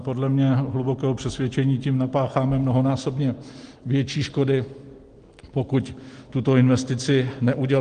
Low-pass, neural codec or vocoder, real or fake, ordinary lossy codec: 9.9 kHz; none; real; Opus, 24 kbps